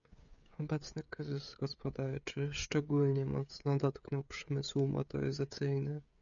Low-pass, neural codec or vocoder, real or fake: 7.2 kHz; codec, 16 kHz, 16 kbps, FreqCodec, smaller model; fake